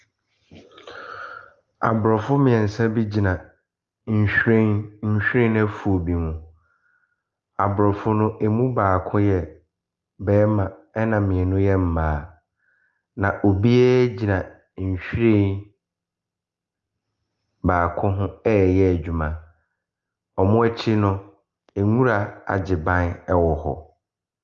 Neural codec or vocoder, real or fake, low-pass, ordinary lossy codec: none; real; 7.2 kHz; Opus, 32 kbps